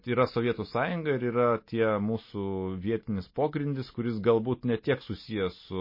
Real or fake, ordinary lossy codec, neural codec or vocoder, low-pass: real; MP3, 24 kbps; none; 5.4 kHz